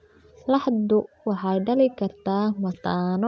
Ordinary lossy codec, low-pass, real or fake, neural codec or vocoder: none; none; real; none